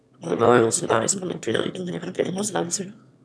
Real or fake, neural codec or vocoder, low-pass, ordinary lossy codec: fake; autoencoder, 22.05 kHz, a latent of 192 numbers a frame, VITS, trained on one speaker; none; none